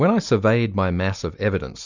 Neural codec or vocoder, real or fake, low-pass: none; real; 7.2 kHz